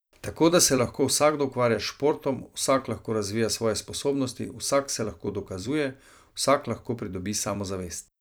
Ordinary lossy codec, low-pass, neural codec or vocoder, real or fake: none; none; vocoder, 44.1 kHz, 128 mel bands every 256 samples, BigVGAN v2; fake